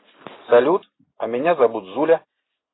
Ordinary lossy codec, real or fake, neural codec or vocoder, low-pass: AAC, 16 kbps; real; none; 7.2 kHz